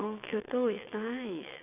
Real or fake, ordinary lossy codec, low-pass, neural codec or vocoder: fake; none; 3.6 kHz; vocoder, 22.05 kHz, 80 mel bands, WaveNeXt